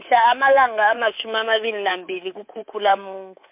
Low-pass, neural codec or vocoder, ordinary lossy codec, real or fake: 3.6 kHz; codec, 24 kHz, 3.1 kbps, DualCodec; MP3, 32 kbps; fake